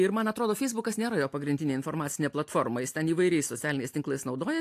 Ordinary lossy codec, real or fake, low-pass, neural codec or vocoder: AAC, 64 kbps; real; 14.4 kHz; none